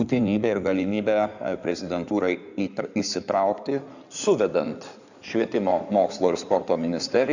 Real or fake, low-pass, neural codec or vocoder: fake; 7.2 kHz; codec, 16 kHz in and 24 kHz out, 2.2 kbps, FireRedTTS-2 codec